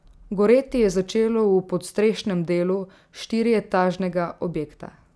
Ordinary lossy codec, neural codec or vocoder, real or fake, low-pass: none; none; real; none